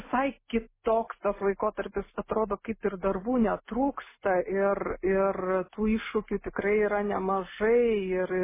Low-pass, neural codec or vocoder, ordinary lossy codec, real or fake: 3.6 kHz; none; MP3, 16 kbps; real